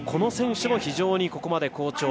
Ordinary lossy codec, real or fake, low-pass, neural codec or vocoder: none; real; none; none